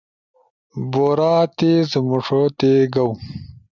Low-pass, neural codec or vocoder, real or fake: 7.2 kHz; none; real